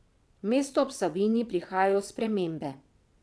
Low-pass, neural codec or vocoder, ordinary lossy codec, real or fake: none; vocoder, 22.05 kHz, 80 mel bands, WaveNeXt; none; fake